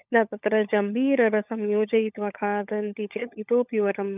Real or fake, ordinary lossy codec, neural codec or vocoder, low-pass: fake; none; codec, 16 kHz, 16 kbps, FunCodec, trained on LibriTTS, 50 frames a second; 3.6 kHz